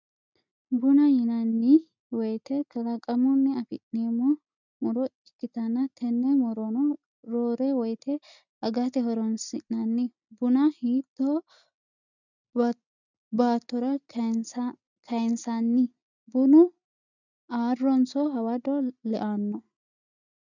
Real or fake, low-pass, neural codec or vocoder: real; 7.2 kHz; none